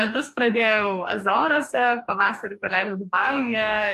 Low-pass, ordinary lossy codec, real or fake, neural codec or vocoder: 14.4 kHz; AAC, 64 kbps; fake; codec, 44.1 kHz, 2.6 kbps, DAC